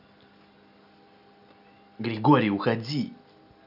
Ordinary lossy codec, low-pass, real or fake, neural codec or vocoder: none; 5.4 kHz; real; none